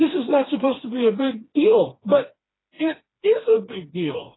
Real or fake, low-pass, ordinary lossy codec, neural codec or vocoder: fake; 7.2 kHz; AAC, 16 kbps; codec, 16 kHz, 2 kbps, FreqCodec, smaller model